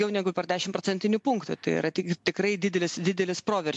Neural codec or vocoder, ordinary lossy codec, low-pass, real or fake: none; MP3, 64 kbps; 10.8 kHz; real